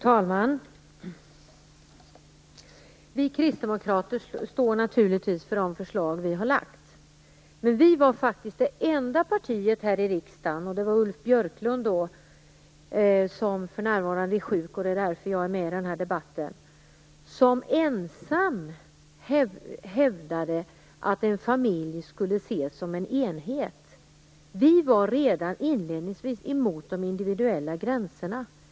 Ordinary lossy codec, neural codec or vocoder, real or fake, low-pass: none; none; real; none